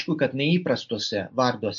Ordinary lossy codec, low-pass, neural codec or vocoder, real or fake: MP3, 48 kbps; 7.2 kHz; none; real